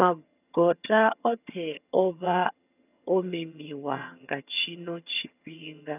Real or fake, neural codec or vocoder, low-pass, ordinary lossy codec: fake; vocoder, 22.05 kHz, 80 mel bands, HiFi-GAN; 3.6 kHz; none